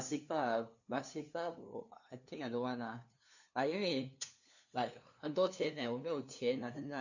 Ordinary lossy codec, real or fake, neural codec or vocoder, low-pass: none; fake; codec, 16 kHz, 2 kbps, FunCodec, trained on LibriTTS, 25 frames a second; 7.2 kHz